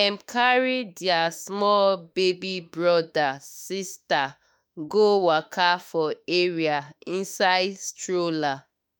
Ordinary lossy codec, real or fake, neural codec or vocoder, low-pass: none; fake; autoencoder, 48 kHz, 32 numbers a frame, DAC-VAE, trained on Japanese speech; none